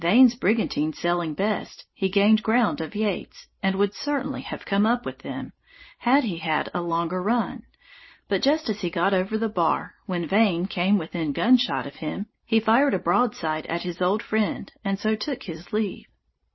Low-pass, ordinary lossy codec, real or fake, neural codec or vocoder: 7.2 kHz; MP3, 24 kbps; real; none